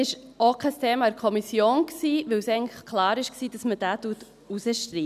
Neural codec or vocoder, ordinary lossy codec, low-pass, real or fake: none; none; 14.4 kHz; real